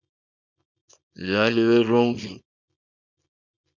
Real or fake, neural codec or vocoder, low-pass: fake; codec, 24 kHz, 0.9 kbps, WavTokenizer, small release; 7.2 kHz